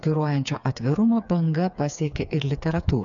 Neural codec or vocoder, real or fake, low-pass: codec, 16 kHz, 4 kbps, FreqCodec, smaller model; fake; 7.2 kHz